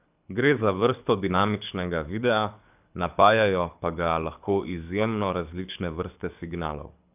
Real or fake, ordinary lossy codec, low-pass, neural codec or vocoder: fake; none; 3.6 kHz; codec, 24 kHz, 6 kbps, HILCodec